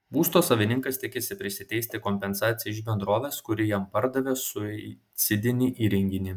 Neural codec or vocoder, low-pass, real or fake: none; 19.8 kHz; real